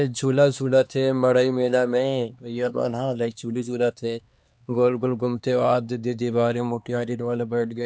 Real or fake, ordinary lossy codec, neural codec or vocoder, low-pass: fake; none; codec, 16 kHz, 2 kbps, X-Codec, HuBERT features, trained on LibriSpeech; none